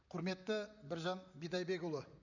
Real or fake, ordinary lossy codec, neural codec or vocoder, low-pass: fake; none; vocoder, 44.1 kHz, 128 mel bands, Pupu-Vocoder; 7.2 kHz